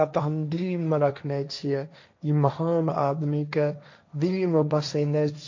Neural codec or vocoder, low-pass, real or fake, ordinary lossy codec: codec, 16 kHz, 1.1 kbps, Voila-Tokenizer; 7.2 kHz; fake; MP3, 48 kbps